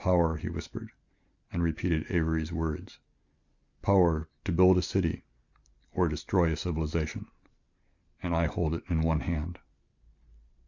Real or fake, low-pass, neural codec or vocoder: real; 7.2 kHz; none